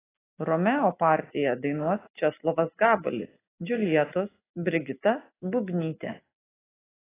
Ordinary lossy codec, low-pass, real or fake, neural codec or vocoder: AAC, 16 kbps; 3.6 kHz; real; none